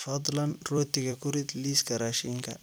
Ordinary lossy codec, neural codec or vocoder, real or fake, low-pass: none; vocoder, 44.1 kHz, 128 mel bands every 256 samples, BigVGAN v2; fake; none